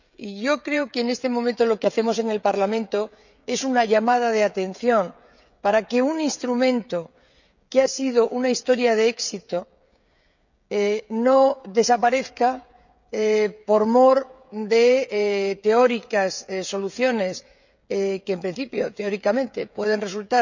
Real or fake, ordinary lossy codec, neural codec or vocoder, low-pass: fake; none; codec, 16 kHz, 16 kbps, FreqCodec, smaller model; 7.2 kHz